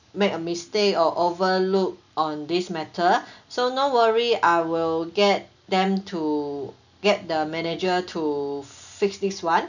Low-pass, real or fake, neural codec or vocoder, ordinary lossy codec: 7.2 kHz; real; none; none